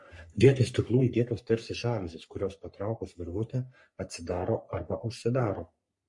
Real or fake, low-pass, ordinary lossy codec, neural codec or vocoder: fake; 10.8 kHz; MP3, 48 kbps; codec, 44.1 kHz, 3.4 kbps, Pupu-Codec